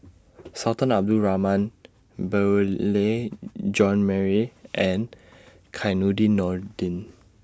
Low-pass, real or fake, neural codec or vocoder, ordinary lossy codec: none; real; none; none